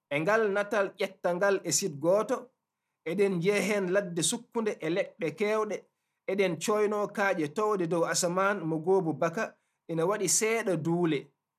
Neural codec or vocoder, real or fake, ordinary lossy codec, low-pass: none; real; none; 14.4 kHz